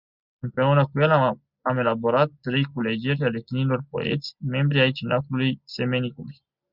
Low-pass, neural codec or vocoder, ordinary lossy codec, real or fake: 5.4 kHz; none; Opus, 64 kbps; real